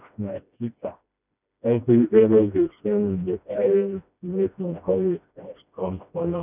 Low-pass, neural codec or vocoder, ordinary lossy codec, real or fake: 3.6 kHz; codec, 16 kHz, 1 kbps, FreqCodec, smaller model; none; fake